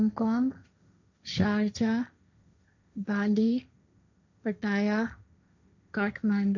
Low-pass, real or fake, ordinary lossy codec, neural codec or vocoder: 7.2 kHz; fake; none; codec, 16 kHz, 1.1 kbps, Voila-Tokenizer